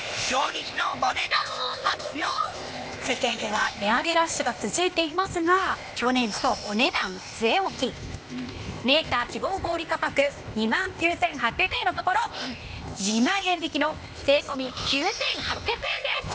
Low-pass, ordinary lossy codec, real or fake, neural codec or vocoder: none; none; fake; codec, 16 kHz, 0.8 kbps, ZipCodec